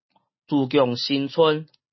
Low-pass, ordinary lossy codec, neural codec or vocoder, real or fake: 7.2 kHz; MP3, 24 kbps; none; real